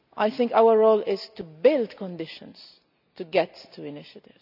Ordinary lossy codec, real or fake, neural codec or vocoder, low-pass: none; real; none; 5.4 kHz